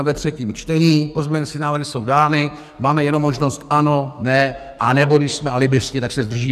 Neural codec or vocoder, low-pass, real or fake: codec, 44.1 kHz, 2.6 kbps, SNAC; 14.4 kHz; fake